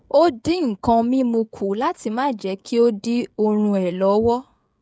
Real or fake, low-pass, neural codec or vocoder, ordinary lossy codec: fake; none; codec, 16 kHz, 16 kbps, FreqCodec, smaller model; none